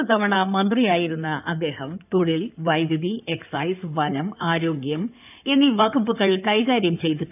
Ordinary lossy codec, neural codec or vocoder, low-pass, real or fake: none; codec, 16 kHz in and 24 kHz out, 2.2 kbps, FireRedTTS-2 codec; 3.6 kHz; fake